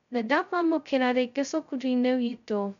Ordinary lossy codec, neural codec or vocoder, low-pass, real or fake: none; codec, 16 kHz, 0.2 kbps, FocalCodec; 7.2 kHz; fake